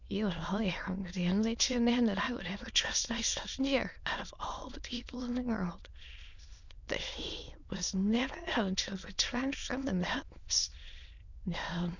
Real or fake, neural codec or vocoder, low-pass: fake; autoencoder, 22.05 kHz, a latent of 192 numbers a frame, VITS, trained on many speakers; 7.2 kHz